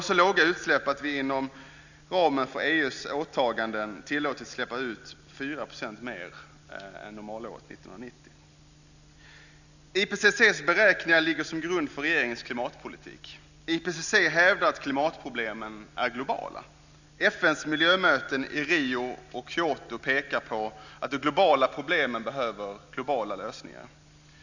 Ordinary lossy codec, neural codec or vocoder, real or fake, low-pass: none; none; real; 7.2 kHz